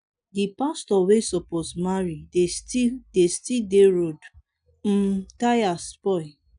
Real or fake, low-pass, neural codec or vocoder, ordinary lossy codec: real; 9.9 kHz; none; none